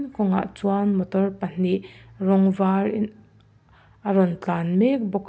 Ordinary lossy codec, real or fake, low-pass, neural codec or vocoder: none; real; none; none